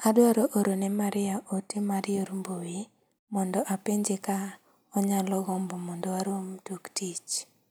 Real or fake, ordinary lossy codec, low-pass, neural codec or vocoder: real; none; none; none